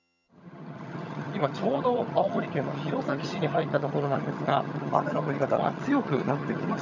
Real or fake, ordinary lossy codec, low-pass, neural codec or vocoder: fake; none; 7.2 kHz; vocoder, 22.05 kHz, 80 mel bands, HiFi-GAN